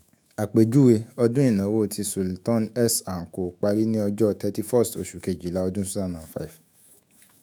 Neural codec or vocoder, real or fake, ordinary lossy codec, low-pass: autoencoder, 48 kHz, 128 numbers a frame, DAC-VAE, trained on Japanese speech; fake; none; none